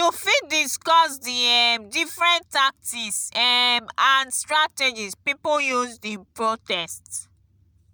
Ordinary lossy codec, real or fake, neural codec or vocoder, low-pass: none; real; none; none